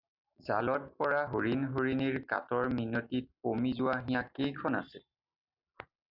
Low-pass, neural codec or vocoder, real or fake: 5.4 kHz; none; real